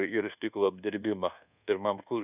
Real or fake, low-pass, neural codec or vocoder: fake; 3.6 kHz; codec, 24 kHz, 1.2 kbps, DualCodec